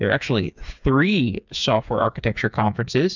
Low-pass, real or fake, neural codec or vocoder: 7.2 kHz; fake; codec, 16 kHz, 4 kbps, FreqCodec, smaller model